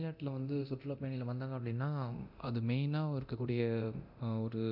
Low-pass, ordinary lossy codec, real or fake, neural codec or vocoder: 5.4 kHz; none; fake; codec, 24 kHz, 0.9 kbps, DualCodec